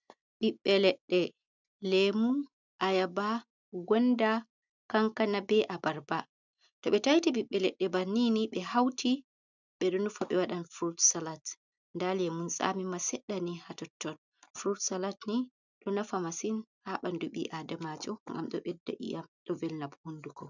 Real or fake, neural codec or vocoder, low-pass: real; none; 7.2 kHz